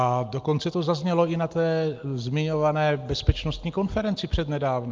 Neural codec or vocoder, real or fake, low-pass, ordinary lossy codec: none; real; 7.2 kHz; Opus, 24 kbps